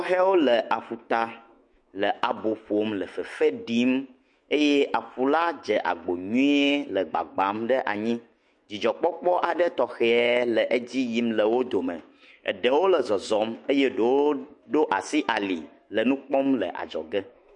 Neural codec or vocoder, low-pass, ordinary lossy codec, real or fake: autoencoder, 48 kHz, 128 numbers a frame, DAC-VAE, trained on Japanese speech; 10.8 kHz; MP3, 48 kbps; fake